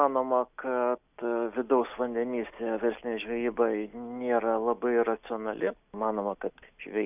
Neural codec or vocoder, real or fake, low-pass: none; real; 3.6 kHz